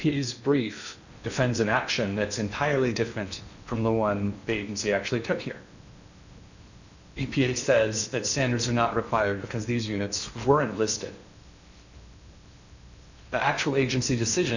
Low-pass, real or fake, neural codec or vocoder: 7.2 kHz; fake; codec, 16 kHz in and 24 kHz out, 0.8 kbps, FocalCodec, streaming, 65536 codes